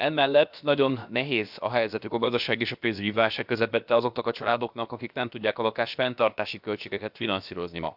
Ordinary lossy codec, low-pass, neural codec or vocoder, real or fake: none; 5.4 kHz; codec, 16 kHz, about 1 kbps, DyCAST, with the encoder's durations; fake